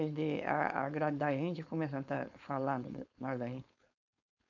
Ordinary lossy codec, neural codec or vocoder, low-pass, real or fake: none; codec, 16 kHz, 4.8 kbps, FACodec; 7.2 kHz; fake